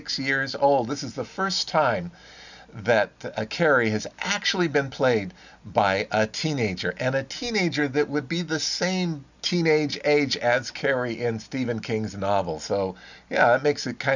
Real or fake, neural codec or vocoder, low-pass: real; none; 7.2 kHz